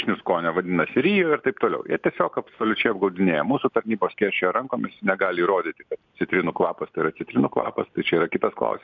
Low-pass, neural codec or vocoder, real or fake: 7.2 kHz; none; real